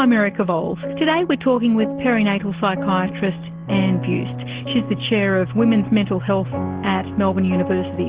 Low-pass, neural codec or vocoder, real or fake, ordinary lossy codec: 3.6 kHz; none; real; Opus, 16 kbps